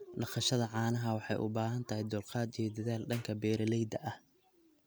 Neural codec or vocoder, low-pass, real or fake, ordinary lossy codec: none; none; real; none